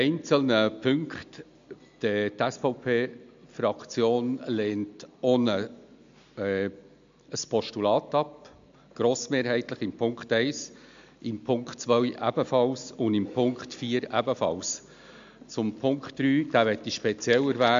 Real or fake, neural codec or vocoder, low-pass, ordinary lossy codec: real; none; 7.2 kHz; MP3, 64 kbps